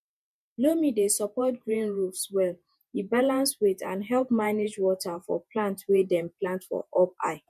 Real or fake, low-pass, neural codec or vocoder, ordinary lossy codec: fake; 14.4 kHz; vocoder, 48 kHz, 128 mel bands, Vocos; MP3, 96 kbps